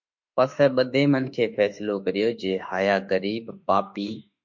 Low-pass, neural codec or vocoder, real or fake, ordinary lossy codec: 7.2 kHz; autoencoder, 48 kHz, 32 numbers a frame, DAC-VAE, trained on Japanese speech; fake; MP3, 48 kbps